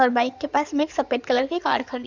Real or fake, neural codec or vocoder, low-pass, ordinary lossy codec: fake; codec, 16 kHz in and 24 kHz out, 2.2 kbps, FireRedTTS-2 codec; 7.2 kHz; none